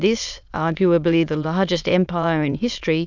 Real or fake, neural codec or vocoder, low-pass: fake; autoencoder, 22.05 kHz, a latent of 192 numbers a frame, VITS, trained on many speakers; 7.2 kHz